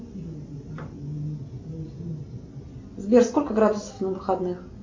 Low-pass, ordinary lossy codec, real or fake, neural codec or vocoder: 7.2 kHz; MP3, 64 kbps; real; none